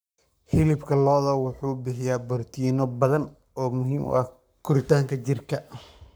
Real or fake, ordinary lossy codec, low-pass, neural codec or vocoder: fake; none; none; codec, 44.1 kHz, 7.8 kbps, Pupu-Codec